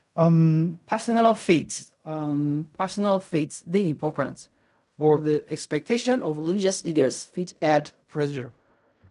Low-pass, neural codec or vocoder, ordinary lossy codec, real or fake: 10.8 kHz; codec, 16 kHz in and 24 kHz out, 0.4 kbps, LongCat-Audio-Codec, fine tuned four codebook decoder; none; fake